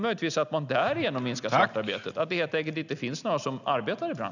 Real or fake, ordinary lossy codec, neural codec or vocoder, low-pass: real; none; none; 7.2 kHz